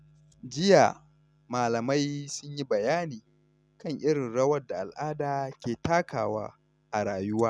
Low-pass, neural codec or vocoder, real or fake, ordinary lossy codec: none; none; real; none